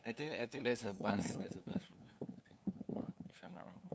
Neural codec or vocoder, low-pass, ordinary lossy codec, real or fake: codec, 16 kHz, 2 kbps, FunCodec, trained on LibriTTS, 25 frames a second; none; none; fake